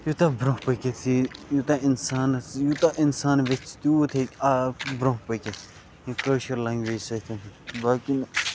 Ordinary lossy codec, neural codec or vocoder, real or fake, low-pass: none; none; real; none